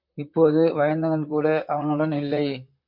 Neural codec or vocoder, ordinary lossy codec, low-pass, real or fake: vocoder, 44.1 kHz, 128 mel bands, Pupu-Vocoder; MP3, 48 kbps; 5.4 kHz; fake